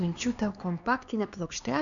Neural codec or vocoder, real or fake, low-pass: codec, 16 kHz, 2 kbps, X-Codec, HuBERT features, trained on LibriSpeech; fake; 7.2 kHz